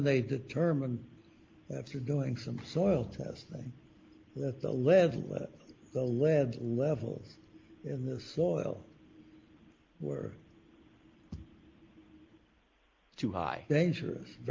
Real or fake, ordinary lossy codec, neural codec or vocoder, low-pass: real; Opus, 32 kbps; none; 7.2 kHz